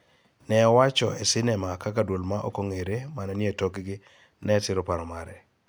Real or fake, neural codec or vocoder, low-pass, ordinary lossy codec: real; none; none; none